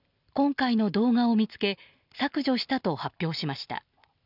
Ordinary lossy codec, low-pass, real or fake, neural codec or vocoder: none; 5.4 kHz; real; none